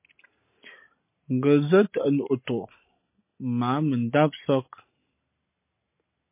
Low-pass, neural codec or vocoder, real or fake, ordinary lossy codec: 3.6 kHz; none; real; MP3, 24 kbps